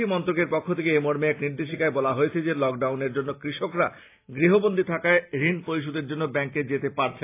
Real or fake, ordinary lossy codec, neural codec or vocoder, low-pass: real; AAC, 24 kbps; none; 3.6 kHz